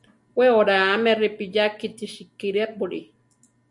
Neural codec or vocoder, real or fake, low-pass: none; real; 10.8 kHz